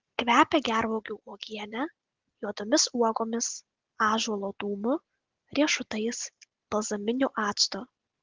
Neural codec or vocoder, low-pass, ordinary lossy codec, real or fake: none; 7.2 kHz; Opus, 16 kbps; real